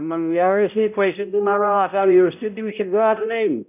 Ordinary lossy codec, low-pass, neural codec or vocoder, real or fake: none; 3.6 kHz; codec, 16 kHz, 0.5 kbps, X-Codec, HuBERT features, trained on balanced general audio; fake